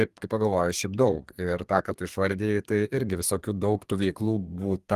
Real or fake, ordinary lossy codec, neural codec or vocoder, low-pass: fake; Opus, 24 kbps; codec, 32 kHz, 1.9 kbps, SNAC; 14.4 kHz